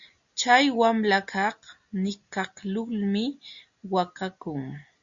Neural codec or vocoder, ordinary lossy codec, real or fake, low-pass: none; Opus, 64 kbps; real; 7.2 kHz